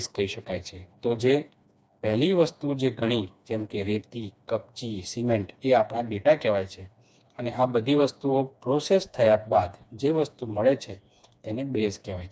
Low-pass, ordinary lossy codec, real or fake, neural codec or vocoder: none; none; fake; codec, 16 kHz, 2 kbps, FreqCodec, smaller model